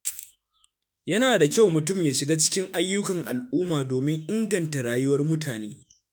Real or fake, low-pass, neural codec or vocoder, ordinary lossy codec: fake; none; autoencoder, 48 kHz, 32 numbers a frame, DAC-VAE, trained on Japanese speech; none